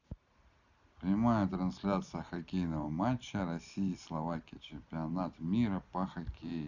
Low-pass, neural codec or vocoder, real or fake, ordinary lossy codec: 7.2 kHz; none; real; none